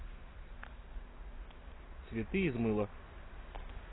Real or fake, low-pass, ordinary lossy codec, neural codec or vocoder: real; 7.2 kHz; AAC, 16 kbps; none